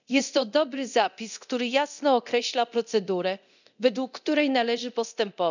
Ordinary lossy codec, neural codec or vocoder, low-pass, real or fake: none; codec, 24 kHz, 0.9 kbps, DualCodec; 7.2 kHz; fake